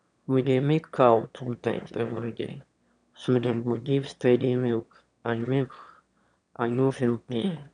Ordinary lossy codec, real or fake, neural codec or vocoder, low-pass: none; fake; autoencoder, 22.05 kHz, a latent of 192 numbers a frame, VITS, trained on one speaker; 9.9 kHz